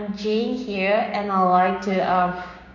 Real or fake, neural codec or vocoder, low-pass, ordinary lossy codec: fake; codec, 16 kHz, 6 kbps, DAC; 7.2 kHz; MP3, 48 kbps